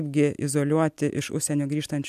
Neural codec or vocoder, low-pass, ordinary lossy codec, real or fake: none; 14.4 kHz; MP3, 96 kbps; real